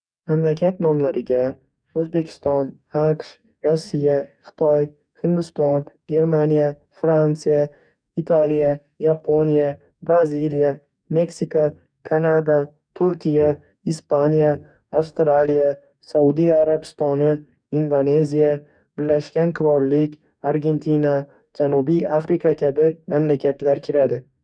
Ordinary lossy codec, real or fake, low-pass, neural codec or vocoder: none; fake; 9.9 kHz; codec, 44.1 kHz, 2.6 kbps, DAC